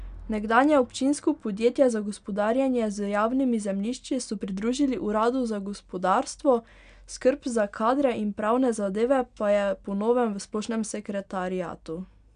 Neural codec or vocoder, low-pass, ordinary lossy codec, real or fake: none; 9.9 kHz; none; real